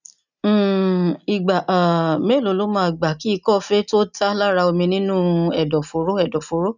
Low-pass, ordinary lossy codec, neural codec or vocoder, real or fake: 7.2 kHz; none; none; real